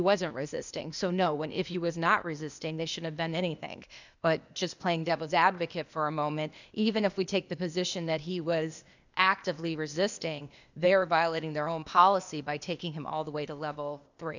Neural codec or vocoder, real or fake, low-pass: codec, 16 kHz, 0.8 kbps, ZipCodec; fake; 7.2 kHz